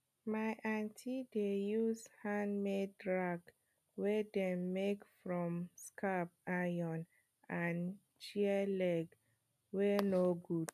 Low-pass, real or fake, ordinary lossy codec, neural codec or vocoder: 14.4 kHz; real; none; none